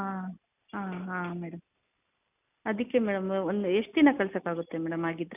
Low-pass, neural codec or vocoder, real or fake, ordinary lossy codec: 3.6 kHz; none; real; none